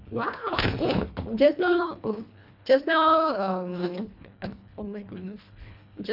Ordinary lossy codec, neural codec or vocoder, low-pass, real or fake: none; codec, 24 kHz, 1.5 kbps, HILCodec; 5.4 kHz; fake